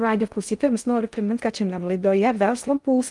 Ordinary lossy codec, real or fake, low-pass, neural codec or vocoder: Opus, 32 kbps; fake; 10.8 kHz; codec, 16 kHz in and 24 kHz out, 0.6 kbps, FocalCodec, streaming, 2048 codes